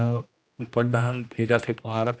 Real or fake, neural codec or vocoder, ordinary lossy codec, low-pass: fake; codec, 16 kHz, 1 kbps, X-Codec, HuBERT features, trained on general audio; none; none